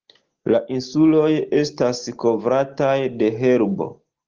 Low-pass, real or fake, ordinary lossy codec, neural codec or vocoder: 7.2 kHz; real; Opus, 16 kbps; none